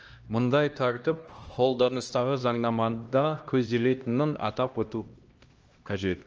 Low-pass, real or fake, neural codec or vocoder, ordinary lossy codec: 7.2 kHz; fake; codec, 16 kHz, 1 kbps, X-Codec, HuBERT features, trained on LibriSpeech; Opus, 24 kbps